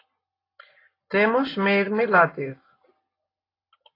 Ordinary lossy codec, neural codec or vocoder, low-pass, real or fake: AAC, 24 kbps; none; 5.4 kHz; real